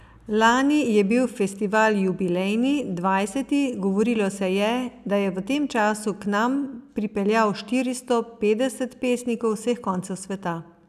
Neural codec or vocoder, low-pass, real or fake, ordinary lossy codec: none; 14.4 kHz; real; none